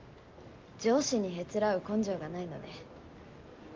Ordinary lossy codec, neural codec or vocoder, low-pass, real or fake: Opus, 24 kbps; none; 7.2 kHz; real